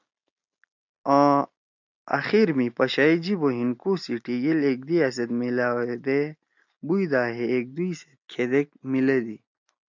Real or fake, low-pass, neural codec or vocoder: real; 7.2 kHz; none